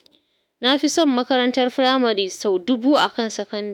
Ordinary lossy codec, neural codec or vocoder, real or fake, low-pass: none; autoencoder, 48 kHz, 32 numbers a frame, DAC-VAE, trained on Japanese speech; fake; 19.8 kHz